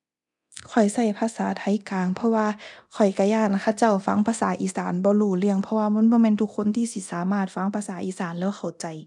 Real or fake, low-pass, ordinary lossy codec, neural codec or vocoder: fake; 10.8 kHz; none; codec, 24 kHz, 0.9 kbps, DualCodec